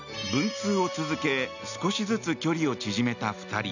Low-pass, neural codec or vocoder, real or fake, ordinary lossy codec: 7.2 kHz; none; real; none